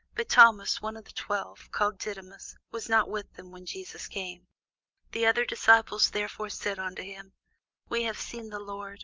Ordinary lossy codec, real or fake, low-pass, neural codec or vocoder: Opus, 24 kbps; real; 7.2 kHz; none